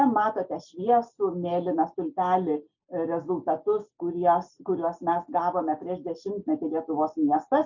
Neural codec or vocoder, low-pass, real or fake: none; 7.2 kHz; real